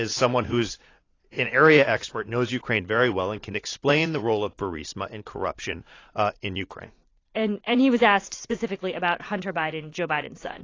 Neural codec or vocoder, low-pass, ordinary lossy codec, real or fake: vocoder, 44.1 kHz, 80 mel bands, Vocos; 7.2 kHz; AAC, 32 kbps; fake